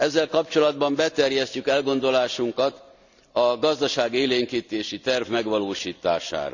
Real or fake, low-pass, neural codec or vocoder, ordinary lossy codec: real; 7.2 kHz; none; none